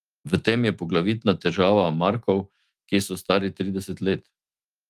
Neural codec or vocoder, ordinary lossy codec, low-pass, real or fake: autoencoder, 48 kHz, 128 numbers a frame, DAC-VAE, trained on Japanese speech; Opus, 32 kbps; 14.4 kHz; fake